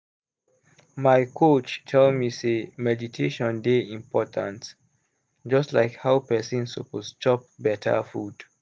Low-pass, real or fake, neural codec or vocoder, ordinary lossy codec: none; real; none; none